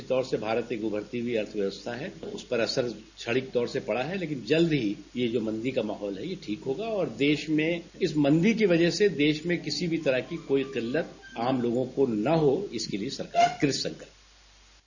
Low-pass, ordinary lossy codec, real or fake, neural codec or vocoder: 7.2 kHz; none; real; none